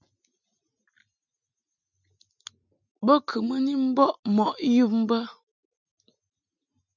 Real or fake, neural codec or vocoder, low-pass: real; none; 7.2 kHz